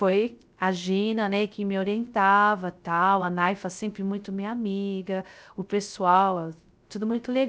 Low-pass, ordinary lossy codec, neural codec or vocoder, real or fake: none; none; codec, 16 kHz, 0.3 kbps, FocalCodec; fake